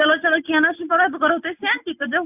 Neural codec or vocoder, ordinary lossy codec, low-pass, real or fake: none; none; 3.6 kHz; real